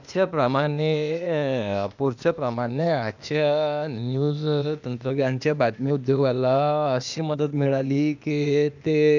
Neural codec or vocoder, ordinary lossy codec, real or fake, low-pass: codec, 16 kHz, 0.8 kbps, ZipCodec; none; fake; 7.2 kHz